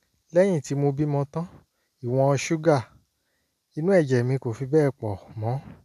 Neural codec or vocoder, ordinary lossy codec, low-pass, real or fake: none; none; 14.4 kHz; real